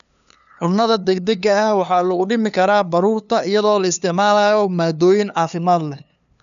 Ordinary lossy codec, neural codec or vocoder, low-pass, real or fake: none; codec, 16 kHz, 2 kbps, FunCodec, trained on LibriTTS, 25 frames a second; 7.2 kHz; fake